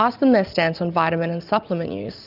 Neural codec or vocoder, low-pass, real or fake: none; 5.4 kHz; real